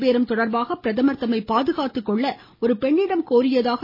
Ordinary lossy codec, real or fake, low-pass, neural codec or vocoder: MP3, 24 kbps; real; 5.4 kHz; none